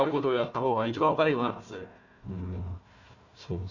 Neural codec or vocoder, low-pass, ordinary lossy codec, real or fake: codec, 16 kHz, 1 kbps, FunCodec, trained on Chinese and English, 50 frames a second; 7.2 kHz; none; fake